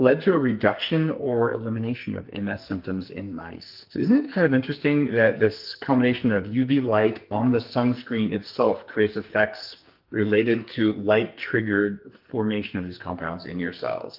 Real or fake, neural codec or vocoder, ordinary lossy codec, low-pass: fake; codec, 44.1 kHz, 2.6 kbps, SNAC; Opus, 32 kbps; 5.4 kHz